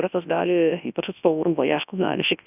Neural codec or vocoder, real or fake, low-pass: codec, 24 kHz, 0.9 kbps, WavTokenizer, large speech release; fake; 3.6 kHz